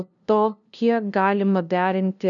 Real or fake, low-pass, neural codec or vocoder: fake; 7.2 kHz; codec, 16 kHz, 0.5 kbps, FunCodec, trained on LibriTTS, 25 frames a second